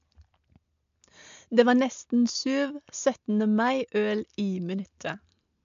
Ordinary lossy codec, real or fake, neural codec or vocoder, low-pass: MP3, 64 kbps; real; none; 7.2 kHz